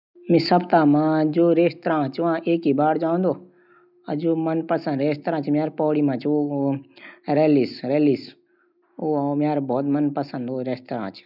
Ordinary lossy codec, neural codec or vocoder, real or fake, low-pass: none; none; real; 5.4 kHz